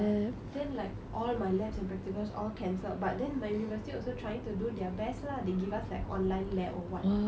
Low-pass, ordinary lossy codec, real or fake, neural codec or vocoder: none; none; real; none